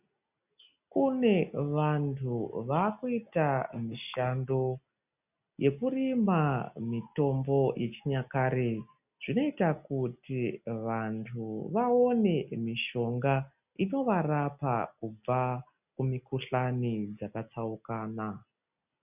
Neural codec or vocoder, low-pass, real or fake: none; 3.6 kHz; real